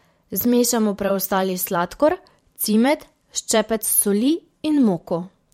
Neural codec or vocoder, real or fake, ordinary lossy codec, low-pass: vocoder, 44.1 kHz, 128 mel bands every 512 samples, BigVGAN v2; fake; MP3, 64 kbps; 19.8 kHz